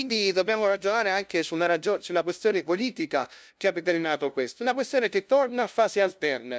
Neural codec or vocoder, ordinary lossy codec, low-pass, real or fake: codec, 16 kHz, 0.5 kbps, FunCodec, trained on LibriTTS, 25 frames a second; none; none; fake